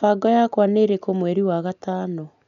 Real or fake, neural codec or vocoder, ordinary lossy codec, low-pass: real; none; none; 7.2 kHz